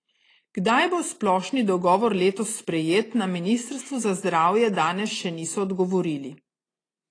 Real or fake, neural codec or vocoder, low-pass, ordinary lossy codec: real; none; 9.9 kHz; AAC, 32 kbps